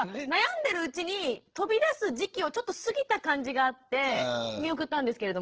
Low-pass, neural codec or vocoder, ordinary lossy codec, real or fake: 7.2 kHz; codec, 16 kHz, 8 kbps, FreqCodec, larger model; Opus, 16 kbps; fake